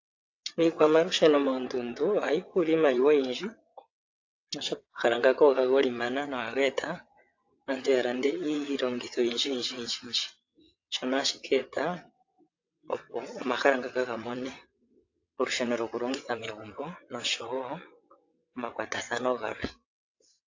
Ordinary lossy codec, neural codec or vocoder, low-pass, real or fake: AAC, 48 kbps; vocoder, 22.05 kHz, 80 mel bands, WaveNeXt; 7.2 kHz; fake